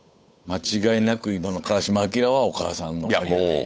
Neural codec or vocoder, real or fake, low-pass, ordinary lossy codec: codec, 16 kHz, 8 kbps, FunCodec, trained on Chinese and English, 25 frames a second; fake; none; none